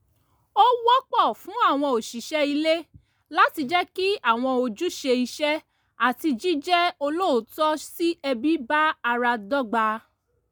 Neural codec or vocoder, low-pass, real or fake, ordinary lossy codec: none; none; real; none